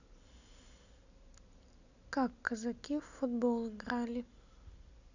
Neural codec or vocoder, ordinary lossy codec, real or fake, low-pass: none; none; real; 7.2 kHz